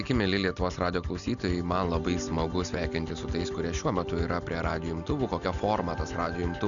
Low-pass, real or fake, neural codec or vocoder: 7.2 kHz; real; none